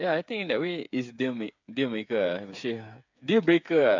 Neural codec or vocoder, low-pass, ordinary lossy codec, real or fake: codec, 16 kHz, 8 kbps, FreqCodec, smaller model; 7.2 kHz; MP3, 64 kbps; fake